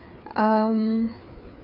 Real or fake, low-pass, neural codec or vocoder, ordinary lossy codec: fake; 5.4 kHz; codec, 16 kHz, 4 kbps, FreqCodec, larger model; none